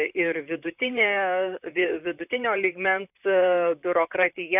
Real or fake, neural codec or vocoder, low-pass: fake; vocoder, 44.1 kHz, 128 mel bands, Pupu-Vocoder; 3.6 kHz